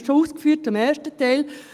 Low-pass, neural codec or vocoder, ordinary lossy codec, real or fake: 14.4 kHz; none; Opus, 32 kbps; real